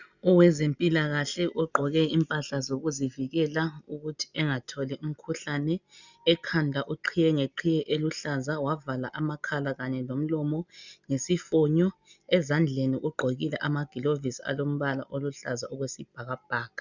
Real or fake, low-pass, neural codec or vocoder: real; 7.2 kHz; none